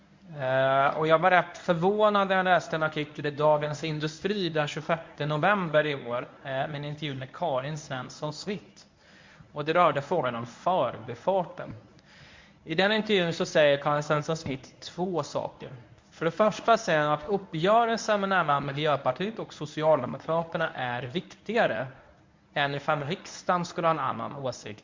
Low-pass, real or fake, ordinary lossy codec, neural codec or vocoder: 7.2 kHz; fake; MP3, 64 kbps; codec, 24 kHz, 0.9 kbps, WavTokenizer, medium speech release version 1